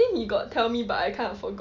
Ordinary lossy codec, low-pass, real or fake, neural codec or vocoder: none; 7.2 kHz; real; none